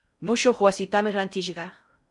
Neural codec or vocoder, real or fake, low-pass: codec, 16 kHz in and 24 kHz out, 0.6 kbps, FocalCodec, streaming, 4096 codes; fake; 10.8 kHz